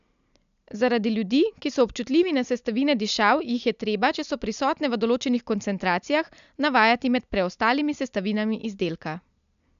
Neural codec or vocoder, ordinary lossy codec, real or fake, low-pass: none; none; real; 7.2 kHz